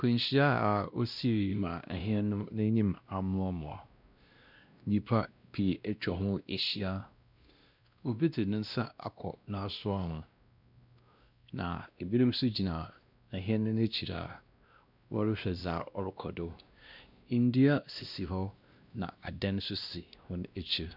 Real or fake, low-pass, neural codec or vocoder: fake; 5.4 kHz; codec, 16 kHz, 1 kbps, X-Codec, WavLM features, trained on Multilingual LibriSpeech